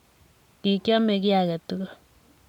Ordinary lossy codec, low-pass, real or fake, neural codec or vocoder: none; 19.8 kHz; real; none